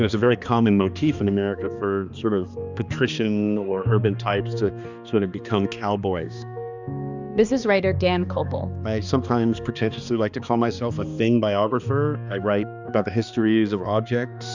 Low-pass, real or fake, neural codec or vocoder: 7.2 kHz; fake; codec, 16 kHz, 2 kbps, X-Codec, HuBERT features, trained on balanced general audio